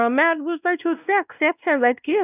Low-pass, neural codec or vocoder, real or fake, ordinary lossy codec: 3.6 kHz; codec, 16 kHz, 1 kbps, X-Codec, WavLM features, trained on Multilingual LibriSpeech; fake; none